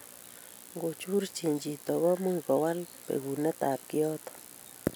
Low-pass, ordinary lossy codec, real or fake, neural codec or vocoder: none; none; real; none